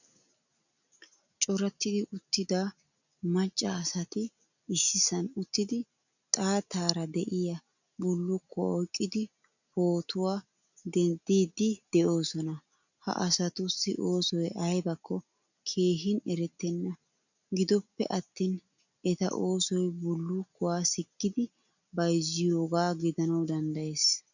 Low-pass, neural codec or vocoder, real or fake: 7.2 kHz; none; real